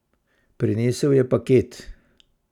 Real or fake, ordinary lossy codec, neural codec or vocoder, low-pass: real; none; none; 19.8 kHz